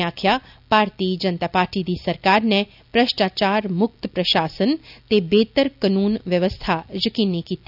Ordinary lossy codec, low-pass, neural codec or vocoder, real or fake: none; 5.4 kHz; none; real